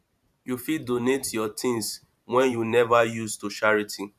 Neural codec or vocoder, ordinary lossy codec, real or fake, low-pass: vocoder, 44.1 kHz, 128 mel bands every 512 samples, BigVGAN v2; none; fake; 14.4 kHz